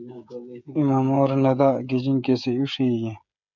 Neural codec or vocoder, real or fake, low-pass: codec, 16 kHz, 16 kbps, FreqCodec, smaller model; fake; 7.2 kHz